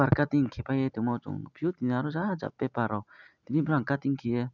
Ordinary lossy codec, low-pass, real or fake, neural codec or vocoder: none; 7.2 kHz; real; none